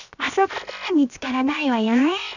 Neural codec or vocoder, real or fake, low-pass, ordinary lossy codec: codec, 16 kHz, 0.7 kbps, FocalCodec; fake; 7.2 kHz; none